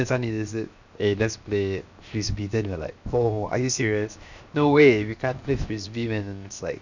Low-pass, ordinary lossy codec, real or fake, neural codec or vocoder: 7.2 kHz; none; fake; codec, 16 kHz, 0.7 kbps, FocalCodec